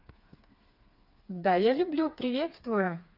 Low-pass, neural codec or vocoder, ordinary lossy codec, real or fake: 5.4 kHz; codec, 16 kHz, 4 kbps, FreqCodec, smaller model; none; fake